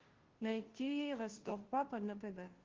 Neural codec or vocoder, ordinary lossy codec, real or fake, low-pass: codec, 16 kHz, 0.5 kbps, FunCodec, trained on Chinese and English, 25 frames a second; Opus, 16 kbps; fake; 7.2 kHz